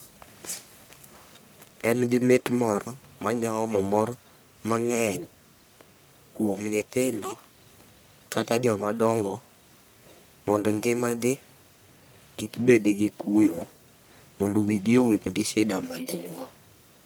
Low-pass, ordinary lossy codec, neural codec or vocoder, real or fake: none; none; codec, 44.1 kHz, 1.7 kbps, Pupu-Codec; fake